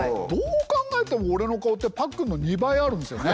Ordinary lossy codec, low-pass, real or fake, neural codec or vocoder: none; none; real; none